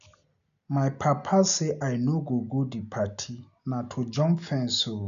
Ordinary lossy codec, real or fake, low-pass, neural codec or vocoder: none; real; 7.2 kHz; none